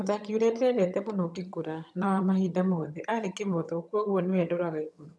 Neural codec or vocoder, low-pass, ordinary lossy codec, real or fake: vocoder, 22.05 kHz, 80 mel bands, HiFi-GAN; none; none; fake